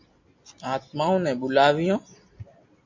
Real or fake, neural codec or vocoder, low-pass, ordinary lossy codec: real; none; 7.2 kHz; MP3, 48 kbps